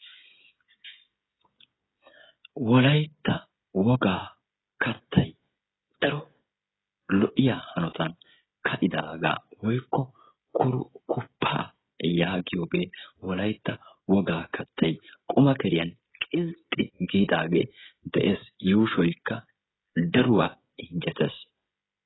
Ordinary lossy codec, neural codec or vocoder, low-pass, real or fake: AAC, 16 kbps; codec, 16 kHz, 16 kbps, FreqCodec, smaller model; 7.2 kHz; fake